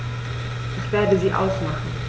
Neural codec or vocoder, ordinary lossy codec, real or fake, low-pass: none; none; real; none